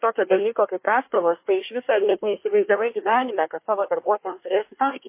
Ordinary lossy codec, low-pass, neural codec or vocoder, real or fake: MP3, 24 kbps; 3.6 kHz; codec, 24 kHz, 1 kbps, SNAC; fake